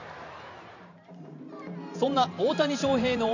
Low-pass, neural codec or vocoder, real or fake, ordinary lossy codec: 7.2 kHz; vocoder, 44.1 kHz, 128 mel bands every 512 samples, BigVGAN v2; fake; none